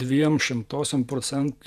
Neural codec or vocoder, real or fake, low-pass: vocoder, 48 kHz, 128 mel bands, Vocos; fake; 14.4 kHz